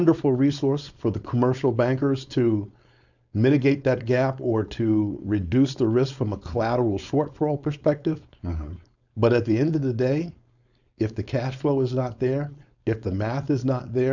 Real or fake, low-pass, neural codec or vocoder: fake; 7.2 kHz; codec, 16 kHz, 4.8 kbps, FACodec